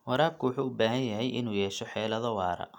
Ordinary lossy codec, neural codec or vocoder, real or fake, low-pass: none; none; real; 19.8 kHz